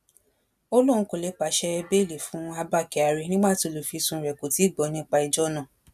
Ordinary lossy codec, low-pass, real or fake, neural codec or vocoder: none; 14.4 kHz; real; none